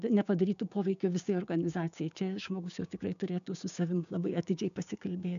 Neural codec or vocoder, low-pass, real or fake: codec, 16 kHz, 6 kbps, DAC; 7.2 kHz; fake